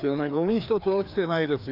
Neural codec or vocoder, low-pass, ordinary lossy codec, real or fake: codec, 16 kHz, 2 kbps, FreqCodec, larger model; 5.4 kHz; none; fake